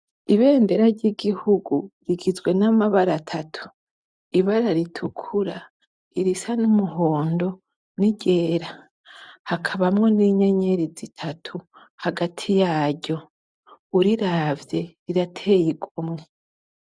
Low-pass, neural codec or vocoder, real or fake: 9.9 kHz; none; real